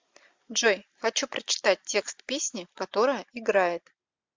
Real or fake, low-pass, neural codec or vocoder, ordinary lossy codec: real; 7.2 kHz; none; MP3, 64 kbps